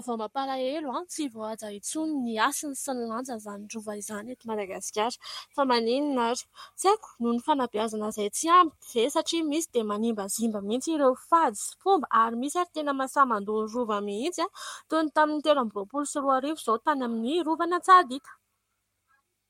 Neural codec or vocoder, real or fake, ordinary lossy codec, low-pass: codec, 44.1 kHz, 7.8 kbps, Pupu-Codec; fake; MP3, 64 kbps; 19.8 kHz